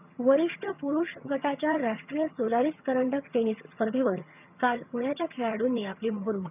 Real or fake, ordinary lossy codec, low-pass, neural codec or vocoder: fake; none; 3.6 kHz; vocoder, 22.05 kHz, 80 mel bands, HiFi-GAN